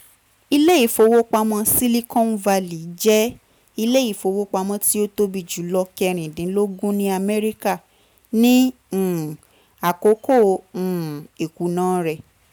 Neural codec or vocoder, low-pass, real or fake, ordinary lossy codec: none; none; real; none